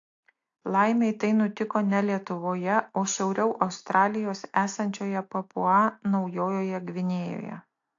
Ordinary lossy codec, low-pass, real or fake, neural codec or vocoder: AAC, 48 kbps; 7.2 kHz; real; none